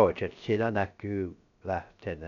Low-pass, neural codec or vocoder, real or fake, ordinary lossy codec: 7.2 kHz; codec, 16 kHz, 0.3 kbps, FocalCodec; fake; none